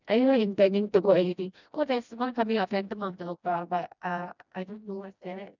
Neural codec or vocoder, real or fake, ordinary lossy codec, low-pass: codec, 16 kHz, 1 kbps, FreqCodec, smaller model; fake; none; 7.2 kHz